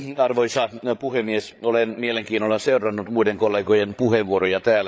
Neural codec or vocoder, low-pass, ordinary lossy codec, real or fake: codec, 16 kHz, 8 kbps, FreqCodec, larger model; none; none; fake